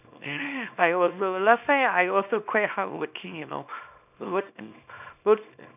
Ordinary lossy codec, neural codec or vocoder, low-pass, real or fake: none; codec, 24 kHz, 0.9 kbps, WavTokenizer, small release; 3.6 kHz; fake